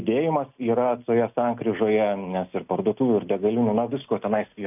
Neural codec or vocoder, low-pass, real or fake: none; 3.6 kHz; real